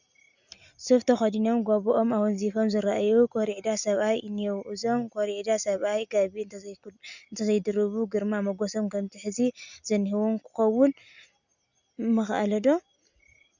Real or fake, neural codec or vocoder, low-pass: real; none; 7.2 kHz